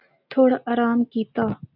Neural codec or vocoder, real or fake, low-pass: none; real; 5.4 kHz